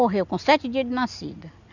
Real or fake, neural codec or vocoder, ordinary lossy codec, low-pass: real; none; none; 7.2 kHz